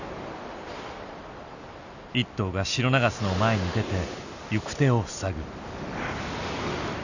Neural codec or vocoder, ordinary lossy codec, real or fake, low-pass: none; none; real; 7.2 kHz